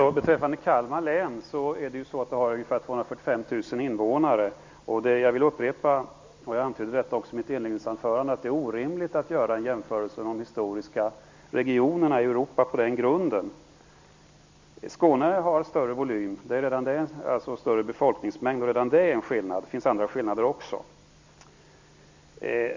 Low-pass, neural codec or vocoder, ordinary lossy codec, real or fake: 7.2 kHz; none; MP3, 64 kbps; real